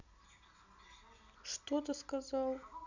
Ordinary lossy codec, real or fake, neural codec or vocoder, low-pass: none; real; none; 7.2 kHz